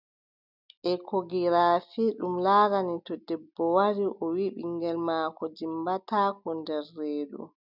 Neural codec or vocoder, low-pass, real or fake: none; 5.4 kHz; real